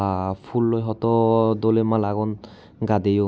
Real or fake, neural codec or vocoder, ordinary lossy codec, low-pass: real; none; none; none